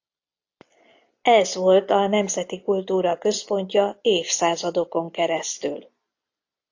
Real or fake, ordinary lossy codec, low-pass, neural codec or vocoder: real; AAC, 48 kbps; 7.2 kHz; none